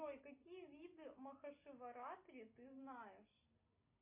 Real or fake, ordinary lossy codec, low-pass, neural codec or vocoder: real; MP3, 24 kbps; 3.6 kHz; none